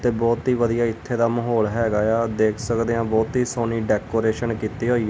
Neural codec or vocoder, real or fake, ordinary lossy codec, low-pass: none; real; none; none